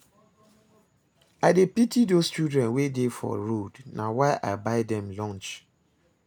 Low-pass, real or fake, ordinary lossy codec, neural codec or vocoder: none; real; none; none